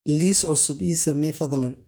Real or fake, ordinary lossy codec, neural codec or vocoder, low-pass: fake; none; codec, 44.1 kHz, 2.6 kbps, DAC; none